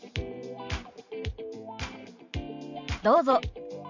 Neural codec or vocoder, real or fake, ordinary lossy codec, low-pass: vocoder, 44.1 kHz, 128 mel bands every 512 samples, BigVGAN v2; fake; none; 7.2 kHz